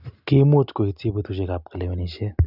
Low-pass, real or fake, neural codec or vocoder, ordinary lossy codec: 5.4 kHz; real; none; none